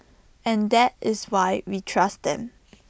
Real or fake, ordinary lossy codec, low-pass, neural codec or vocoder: real; none; none; none